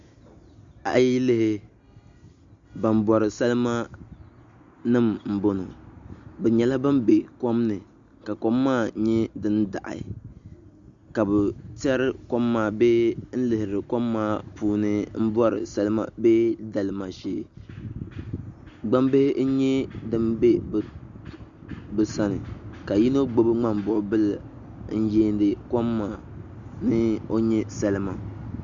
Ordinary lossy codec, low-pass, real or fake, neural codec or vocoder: Opus, 64 kbps; 7.2 kHz; real; none